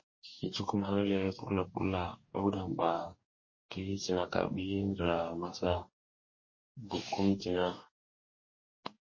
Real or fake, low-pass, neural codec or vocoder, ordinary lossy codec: fake; 7.2 kHz; codec, 44.1 kHz, 2.6 kbps, DAC; MP3, 32 kbps